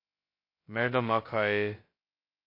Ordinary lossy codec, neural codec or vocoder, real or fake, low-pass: MP3, 32 kbps; codec, 16 kHz, 0.2 kbps, FocalCodec; fake; 5.4 kHz